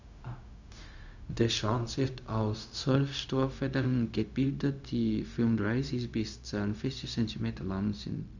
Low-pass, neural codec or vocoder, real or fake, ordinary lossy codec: 7.2 kHz; codec, 16 kHz, 0.4 kbps, LongCat-Audio-Codec; fake; none